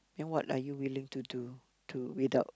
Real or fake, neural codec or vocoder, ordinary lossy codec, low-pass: real; none; none; none